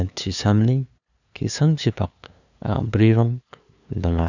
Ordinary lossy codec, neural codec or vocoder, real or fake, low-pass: none; codec, 16 kHz, 2 kbps, FunCodec, trained on LibriTTS, 25 frames a second; fake; 7.2 kHz